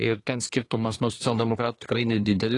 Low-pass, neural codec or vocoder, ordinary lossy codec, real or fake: 10.8 kHz; codec, 24 kHz, 1 kbps, SNAC; AAC, 32 kbps; fake